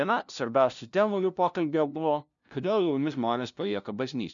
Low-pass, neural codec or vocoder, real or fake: 7.2 kHz; codec, 16 kHz, 0.5 kbps, FunCodec, trained on LibriTTS, 25 frames a second; fake